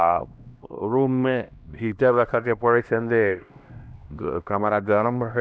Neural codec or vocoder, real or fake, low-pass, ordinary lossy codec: codec, 16 kHz, 1 kbps, X-Codec, HuBERT features, trained on LibriSpeech; fake; none; none